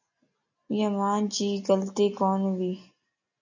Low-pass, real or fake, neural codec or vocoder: 7.2 kHz; real; none